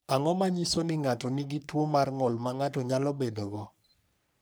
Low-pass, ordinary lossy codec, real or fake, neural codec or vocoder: none; none; fake; codec, 44.1 kHz, 3.4 kbps, Pupu-Codec